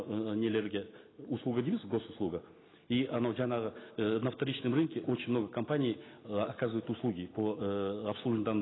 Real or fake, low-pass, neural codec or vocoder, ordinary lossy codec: real; 7.2 kHz; none; AAC, 16 kbps